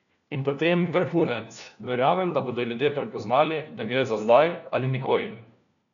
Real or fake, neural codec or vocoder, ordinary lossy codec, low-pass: fake; codec, 16 kHz, 1 kbps, FunCodec, trained on LibriTTS, 50 frames a second; none; 7.2 kHz